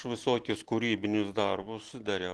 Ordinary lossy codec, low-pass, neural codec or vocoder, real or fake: Opus, 16 kbps; 10.8 kHz; none; real